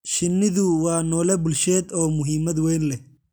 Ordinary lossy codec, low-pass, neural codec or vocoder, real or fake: none; none; none; real